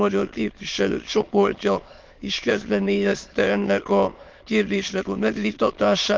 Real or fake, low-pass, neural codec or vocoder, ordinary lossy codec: fake; 7.2 kHz; autoencoder, 22.05 kHz, a latent of 192 numbers a frame, VITS, trained on many speakers; Opus, 32 kbps